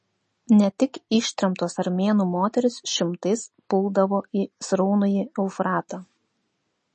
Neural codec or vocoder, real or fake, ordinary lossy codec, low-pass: none; real; MP3, 32 kbps; 10.8 kHz